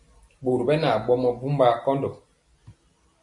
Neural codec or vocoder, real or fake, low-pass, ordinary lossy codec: none; real; 10.8 kHz; MP3, 48 kbps